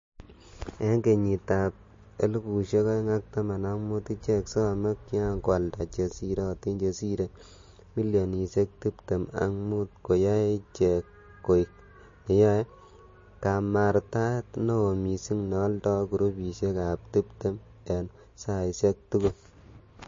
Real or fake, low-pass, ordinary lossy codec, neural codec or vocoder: real; 7.2 kHz; MP3, 32 kbps; none